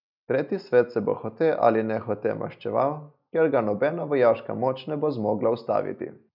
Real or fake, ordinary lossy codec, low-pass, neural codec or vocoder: real; none; 5.4 kHz; none